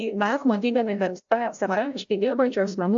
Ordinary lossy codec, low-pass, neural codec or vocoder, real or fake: AAC, 64 kbps; 7.2 kHz; codec, 16 kHz, 0.5 kbps, FreqCodec, larger model; fake